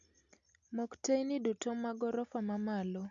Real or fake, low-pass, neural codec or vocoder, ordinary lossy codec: real; 7.2 kHz; none; none